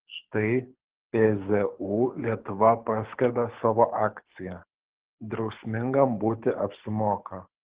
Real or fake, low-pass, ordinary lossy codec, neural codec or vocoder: fake; 3.6 kHz; Opus, 16 kbps; codec, 24 kHz, 6 kbps, HILCodec